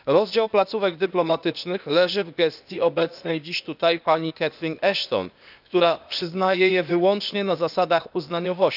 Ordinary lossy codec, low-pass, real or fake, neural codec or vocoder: none; 5.4 kHz; fake; codec, 16 kHz, 0.8 kbps, ZipCodec